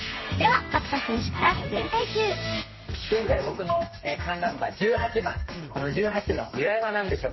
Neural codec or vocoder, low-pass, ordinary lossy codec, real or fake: codec, 32 kHz, 1.9 kbps, SNAC; 7.2 kHz; MP3, 24 kbps; fake